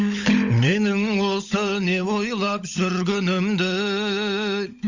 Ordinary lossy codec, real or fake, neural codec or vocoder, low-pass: Opus, 64 kbps; fake; codec, 16 kHz, 16 kbps, FunCodec, trained on LibriTTS, 50 frames a second; 7.2 kHz